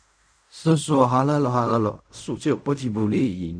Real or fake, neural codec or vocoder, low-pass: fake; codec, 16 kHz in and 24 kHz out, 0.4 kbps, LongCat-Audio-Codec, fine tuned four codebook decoder; 9.9 kHz